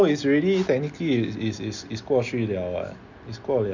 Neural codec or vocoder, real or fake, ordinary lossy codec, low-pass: none; real; none; 7.2 kHz